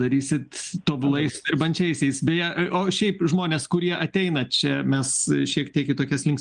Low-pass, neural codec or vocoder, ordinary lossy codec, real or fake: 9.9 kHz; none; Opus, 32 kbps; real